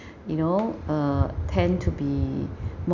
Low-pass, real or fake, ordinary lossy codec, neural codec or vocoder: 7.2 kHz; real; none; none